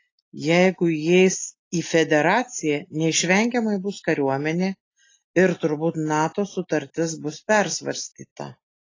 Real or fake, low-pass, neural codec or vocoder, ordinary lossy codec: real; 7.2 kHz; none; AAC, 32 kbps